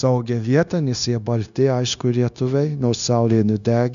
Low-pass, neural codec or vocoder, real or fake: 7.2 kHz; codec, 16 kHz, 0.9 kbps, LongCat-Audio-Codec; fake